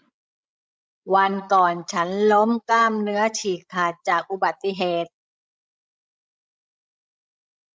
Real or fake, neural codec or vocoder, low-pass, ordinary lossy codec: fake; codec, 16 kHz, 16 kbps, FreqCodec, larger model; none; none